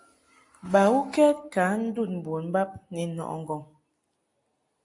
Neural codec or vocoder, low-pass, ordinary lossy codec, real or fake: none; 10.8 kHz; AAC, 48 kbps; real